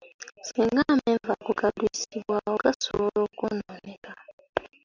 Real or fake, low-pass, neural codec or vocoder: real; 7.2 kHz; none